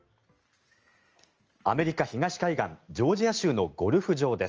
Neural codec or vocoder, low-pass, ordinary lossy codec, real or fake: none; 7.2 kHz; Opus, 24 kbps; real